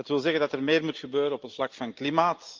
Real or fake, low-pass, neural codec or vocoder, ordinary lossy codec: real; 7.2 kHz; none; Opus, 24 kbps